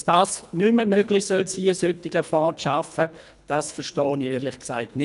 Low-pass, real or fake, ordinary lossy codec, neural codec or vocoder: 10.8 kHz; fake; none; codec, 24 kHz, 1.5 kbps, HILCodec